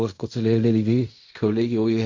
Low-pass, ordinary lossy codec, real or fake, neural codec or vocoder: 7.2 kHz; MP3, 48 kbps; fake; codec, 16 kHz in and 24 kHz out, 0.4 kbps, LongCat-Audio-Codec, fine tuned four codebook decoder